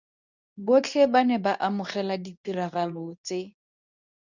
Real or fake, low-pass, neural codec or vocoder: fake; 7.2 kHz; codec, 24 kHz, 0.9 kbps, WavTokenizer, medium speech release version 2